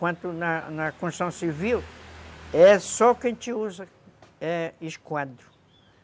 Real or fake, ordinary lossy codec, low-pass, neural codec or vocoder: real; none; none; none